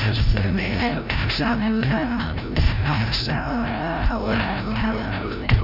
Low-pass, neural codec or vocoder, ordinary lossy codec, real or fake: 5.4 kHz; codec, 16 kHz, 0.5 kbps, FreqCodec, larger model; none; fake